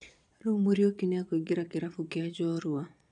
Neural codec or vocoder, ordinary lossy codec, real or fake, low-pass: vocoder, 22.05 kHz, 80 mel bands, Vocos; none; fake; 9.9 kHz